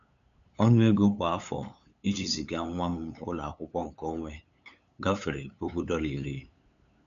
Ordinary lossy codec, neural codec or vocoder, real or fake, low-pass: none; codec, 16 kHz, 8 kbps, FunCodec, trained on LibriTTS, 25 frames a second; fake; 7.2 kHz